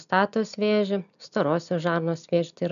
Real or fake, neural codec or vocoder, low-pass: real; none; 7.2 kHz